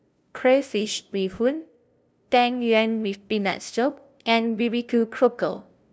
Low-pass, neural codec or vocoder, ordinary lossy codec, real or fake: none; codec, 16 kHz, 0.5 kbps, FunCodec, trained on LibriTTS, 25 frames a second; none; fake